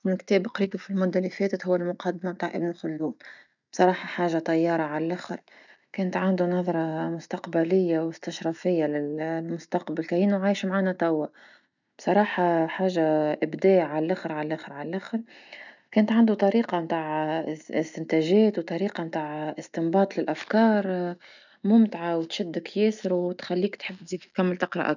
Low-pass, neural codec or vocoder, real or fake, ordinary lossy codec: 7.2 kHz; none; real; none